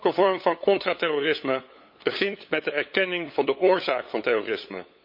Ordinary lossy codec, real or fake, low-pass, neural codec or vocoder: MP3, 32 kbps; fake; 5.4 kHz; codec, 16 kHz, 8 kbps, FunCodec, trained on LibriTTS, 25 frames a second